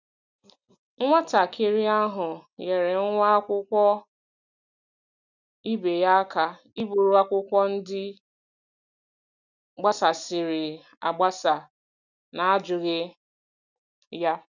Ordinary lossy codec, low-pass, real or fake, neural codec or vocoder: none; 7.2 kHz; real; none